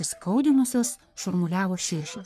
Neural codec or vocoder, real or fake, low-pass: codec, 44.1 kHz, 3.4 kbps, Pupu-Codec; fake; 14.4 kHz